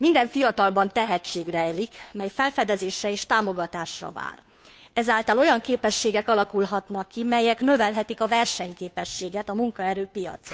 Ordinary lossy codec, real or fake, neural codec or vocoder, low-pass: none; fake; codec, 16 kHz, 2 kbps, FunCodec, trained on Chinese and English, 25 frames a second; none